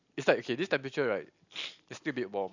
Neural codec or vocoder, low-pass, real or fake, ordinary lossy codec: none; 7.2 kHz; real; none